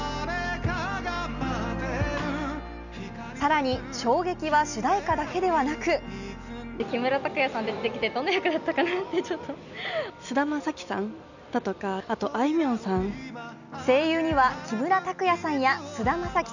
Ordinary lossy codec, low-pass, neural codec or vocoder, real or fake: none; 7.2 kHz; none; real